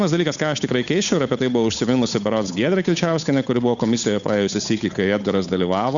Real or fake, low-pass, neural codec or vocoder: fake; 7.2 kHz; codec, 16 kHz, 8 kbps, FunCodec, trained on Chinese and English, 25 frames a second